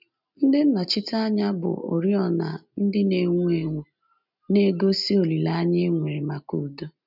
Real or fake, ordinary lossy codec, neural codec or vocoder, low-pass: real; none; none; 5.4 kHz